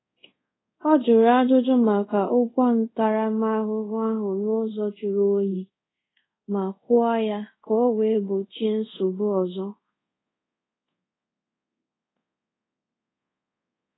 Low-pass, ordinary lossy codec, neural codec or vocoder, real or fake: 7.2 kHz; AAC, 16 kbps; codec, 24 kHz, 0.5 kbps, DualCodec; fake